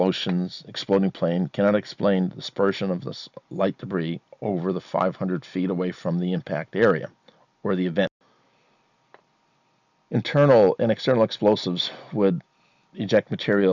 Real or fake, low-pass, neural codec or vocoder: real; 7.2 kHz; none